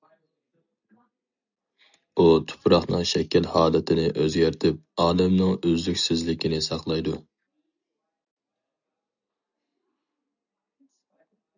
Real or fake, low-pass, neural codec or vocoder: real; 7.2 kHz; none